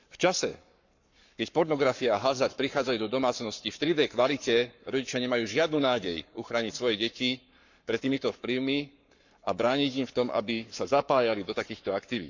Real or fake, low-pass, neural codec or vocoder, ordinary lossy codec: fake; 7.2 kHz; codec, 44.1 kHz, 7.8 kbps, Pupu-Codec; none